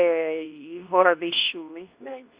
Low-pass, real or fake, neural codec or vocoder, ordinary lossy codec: 3.6 kHz; fake; codec, 24 kHz, 0.9 kbps, WavTokenizer, medium speech release version 2; Opus, 64 kbps